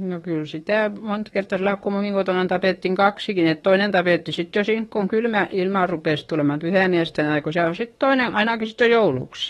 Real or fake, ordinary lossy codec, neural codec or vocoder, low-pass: fake; AAC, 32 kbps; autoencoder, 48 kHz, 32 numbers a frame, DAC-VAE, trained on Japanese speech; 19.8 kHz